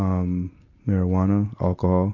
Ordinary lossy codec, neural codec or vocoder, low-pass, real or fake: AAC, 48 kbps; none; 7.2 kHz; real